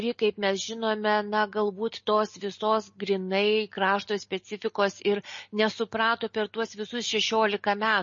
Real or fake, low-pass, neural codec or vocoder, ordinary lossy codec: real; 7.2 kHz; none; MP3, 32 kbps